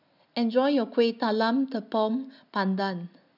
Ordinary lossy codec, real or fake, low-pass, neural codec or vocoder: none; real; 5.4 kHz; none